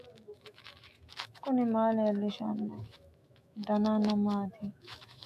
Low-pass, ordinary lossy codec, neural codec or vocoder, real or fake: 14.4 kHz; AAC, 96 kbps; autoencoder, 48 kHz, 128 numbers a frame, DAC-VAE, trained on Japanese speech; fake